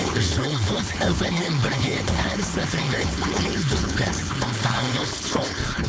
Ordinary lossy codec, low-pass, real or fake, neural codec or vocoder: none; none; fake; codec, 16 kHz, 4.8 kbps, FACodec